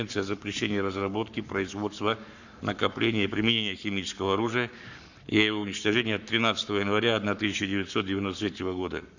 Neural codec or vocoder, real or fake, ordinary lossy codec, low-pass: codec, 44.1 kHz, 7.8 kbps, Pupu-Codec; fake; none; 7.2 kHz